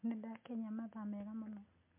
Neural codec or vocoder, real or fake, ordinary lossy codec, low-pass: none; real; MP3, 24 kbps; 3.6 kHz